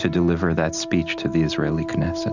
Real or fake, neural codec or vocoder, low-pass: real; none; 7.2 kHz